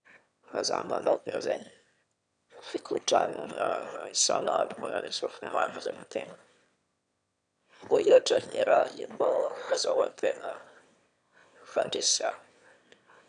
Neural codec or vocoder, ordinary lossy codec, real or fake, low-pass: autoencoder, 22.05 kHz, a latent of 192 numbers a frame, VITS, trained on one speaker; none; fake; 9.9 kHz